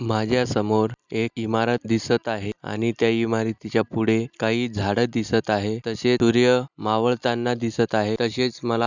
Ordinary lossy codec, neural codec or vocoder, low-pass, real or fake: none; none; 7.2 kHz; real